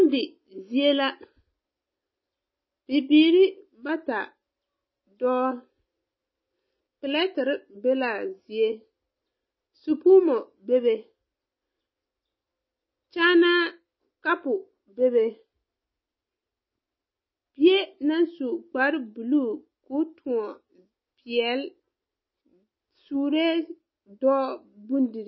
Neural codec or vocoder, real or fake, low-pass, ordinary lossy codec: none; real; 7.2 kHz; MP3, 24 kbps